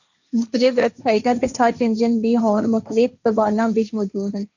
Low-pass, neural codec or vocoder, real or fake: 7.2 kHz; codec, 16 kHz, 1.1 kbps, Voila-Tokenizer; fake